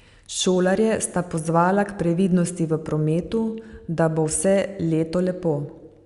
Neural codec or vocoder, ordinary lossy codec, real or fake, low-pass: none; Opus, 64 kbps; real; 10.8 kHz